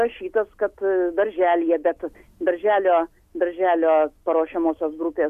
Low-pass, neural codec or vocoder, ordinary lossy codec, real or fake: 14.4 kHz; none; MP3, 96 kbps; real